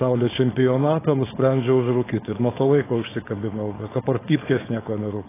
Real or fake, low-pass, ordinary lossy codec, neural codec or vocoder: fake; 3.6 kHz; AAC, 16 kbps; codec, 16 kHz, 4.8 kbps, FACodec